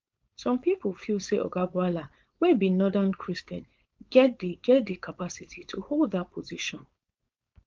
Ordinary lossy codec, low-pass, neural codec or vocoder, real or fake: Opus, 24 kbps; 7.2 kHz; codec, 16 kHz, 4.8 kbps, FACodec; fake